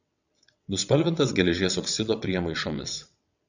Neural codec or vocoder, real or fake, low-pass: vocoder, 22.05 kHz, 80 mel bands, WaveNeXt; fake; 7.2 kHz